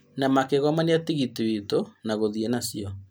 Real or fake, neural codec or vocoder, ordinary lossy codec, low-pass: real; none; none; none